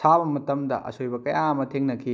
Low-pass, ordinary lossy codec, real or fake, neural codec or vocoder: none; none; real; none